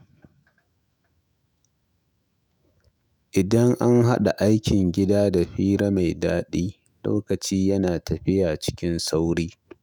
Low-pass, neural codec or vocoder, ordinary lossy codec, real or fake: none; autoencoder, 48 kHz, 128 numbers a frame, DAC-VAE, trained on Japanese speech; none; fake